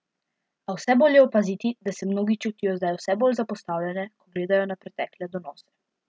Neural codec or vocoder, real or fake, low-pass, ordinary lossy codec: none; real; none; none